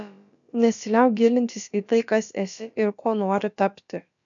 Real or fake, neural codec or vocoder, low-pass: fake; codec, 16 kHz, about 1 kbps, DyCAST, with the encoder's durations; 7.2 kHz